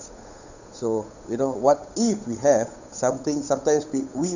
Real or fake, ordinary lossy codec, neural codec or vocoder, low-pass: fake; none; codec, 16 kHz, 8 kbps, FunCodec, trained on Chinese and English, 25 frames a second; 7.2 kHz